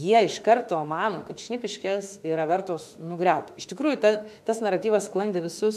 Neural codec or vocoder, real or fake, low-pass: autoencoder, 48 kHz, 32 numbers a frame, DAC-VAE, trained on Japanese speech; fake; 14.4 kHz